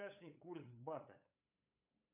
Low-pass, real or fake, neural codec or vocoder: 3.6 kHz; fake; codec, 16 kHz, 8 kbps, FunCodec, trained on LibriTTS, 25 frames a second